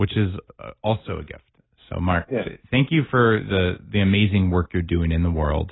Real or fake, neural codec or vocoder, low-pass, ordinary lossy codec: real; none; 7.2 kHz; AAC, 16 kbps